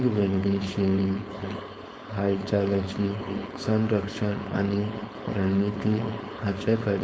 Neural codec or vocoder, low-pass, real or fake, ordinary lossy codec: codec, 16 kHz, 4.8 kbps, FACodec; none; fake; none